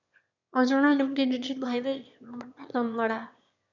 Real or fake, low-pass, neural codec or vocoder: fake; 7.2 kHz; autoencoder, 22.05 kHz, a latent of 192 numbers a frame, VITS, trained on one speaker